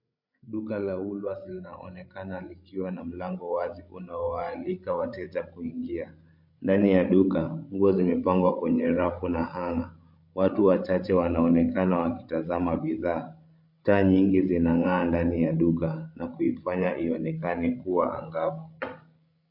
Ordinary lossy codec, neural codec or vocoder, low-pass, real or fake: MP3, 48 kbps; codec, 16 kHz, 16 kbps, FreqCodec, larger model; 5.4 kHz; fake